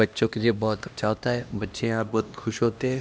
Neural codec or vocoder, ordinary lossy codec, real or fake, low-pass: codec, 16 kHz, 1 kbps, X-Codec, HuBERT features, trained on LibriSpeech; none; fake; none